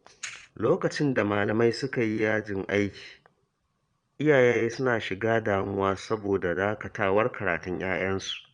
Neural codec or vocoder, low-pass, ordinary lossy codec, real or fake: vocoder, 22.05 kHz, 80 mel bands, Vocos; 9.9 kHz; Opus, 64 kbps; fake